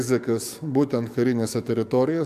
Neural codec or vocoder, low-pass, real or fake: codec, 44.1 kHz, 7.8 kbps, DAC; 14.4 kHz; fake